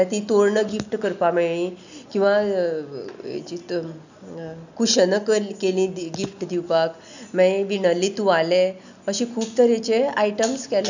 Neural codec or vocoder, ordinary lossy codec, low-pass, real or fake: none; none; 7.2 kHz; real